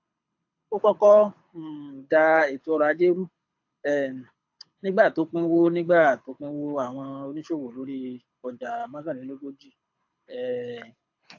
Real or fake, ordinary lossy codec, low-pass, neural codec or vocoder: fake; AAC, 48 kbps; 7.2 kHz; codec, 24 kHz, 6 kbps, HILCodec